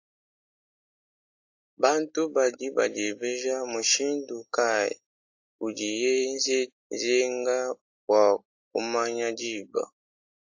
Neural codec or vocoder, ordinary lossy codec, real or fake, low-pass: none; AAC, 48 kbps; real; 7.2 kHz